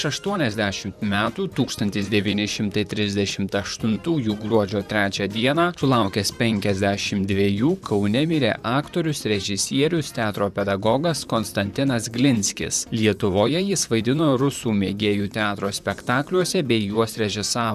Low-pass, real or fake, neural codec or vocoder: 14.4 kHz; fake; vocoder, 44.1 kHz, 128 mel bands, Pupu-Vocoder